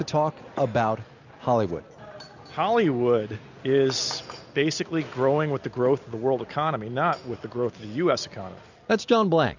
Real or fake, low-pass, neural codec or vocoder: real; 7.2 kHz; none